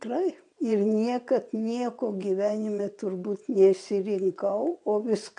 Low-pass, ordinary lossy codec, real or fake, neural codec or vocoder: 9.9 kHz; MP3, 64 kbps; fake; vocoder, 22.05 kHz, 80 mel bands, WaveNeXt